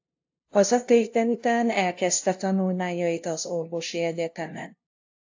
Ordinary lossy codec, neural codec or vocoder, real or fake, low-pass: AAC, 48 kbps; codec, 16 kHz, 0.5 kbps, FunCodec, trained on LibriTTS, 25 frames a second; fake; 7.2 kHz